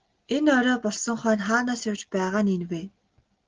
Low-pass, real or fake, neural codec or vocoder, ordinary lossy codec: 7.2 kHz; real; none; Opus, 16 kbps